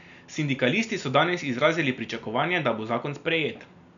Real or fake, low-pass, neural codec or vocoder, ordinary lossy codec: real; 7.2 kHz; none; none